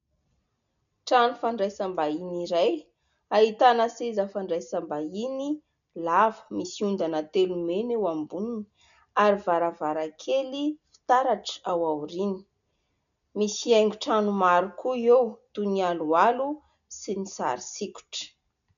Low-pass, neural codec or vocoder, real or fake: 7.2 kHz; none; real